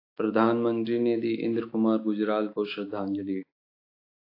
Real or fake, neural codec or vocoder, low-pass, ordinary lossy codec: fake; autoencoder, 48 kHz, 128 numbers a frame, DAC-VAE, trained on Japanese speech; 5.4 kHz; AAC, 32 kbps